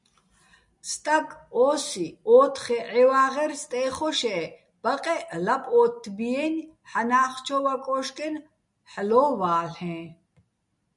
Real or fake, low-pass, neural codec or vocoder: real; 10.8 kHz; none